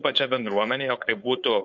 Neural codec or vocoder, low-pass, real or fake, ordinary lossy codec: codec, 16 kHz, 4.8 kbps, FACodec; 7.2 kHz; fake; MP3, 48 kbps